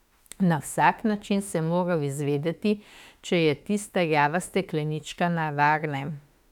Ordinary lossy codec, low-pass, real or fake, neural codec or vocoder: none; 19.8 kHz; fake; autoencoder, 48 kHz, 32 numbers a frame, DAC-VAE, trained on Japanese speech